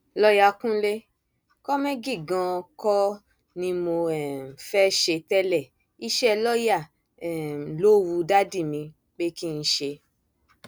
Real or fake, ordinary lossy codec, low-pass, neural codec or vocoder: real; none; none; none